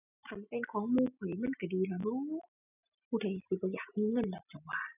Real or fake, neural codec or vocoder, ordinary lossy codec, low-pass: real; none; none; 3.6 kHz